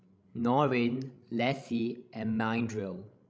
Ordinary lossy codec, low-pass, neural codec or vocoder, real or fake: none; none; codec, 16 kHz, 16 kbps, FreqCodec, larger model; fake